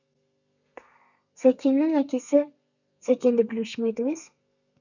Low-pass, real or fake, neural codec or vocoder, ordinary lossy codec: 7.2 kHz; fake; codec, 32 kHz, 1.9 kbps, SNAC; none